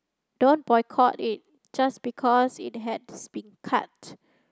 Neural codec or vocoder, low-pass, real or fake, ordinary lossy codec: none; none; real; none